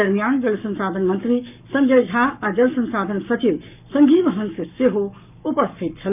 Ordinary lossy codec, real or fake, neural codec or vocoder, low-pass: none; fake; codec, 44.1 kHz, 7.8 kbps, Pupu-Codec; 3.6 kHz